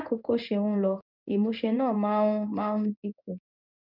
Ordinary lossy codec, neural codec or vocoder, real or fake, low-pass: none; codec, 16 kHz in and 24 kHz out, 1 kbps, XY-Tokenizer; fake; 5.4 kHz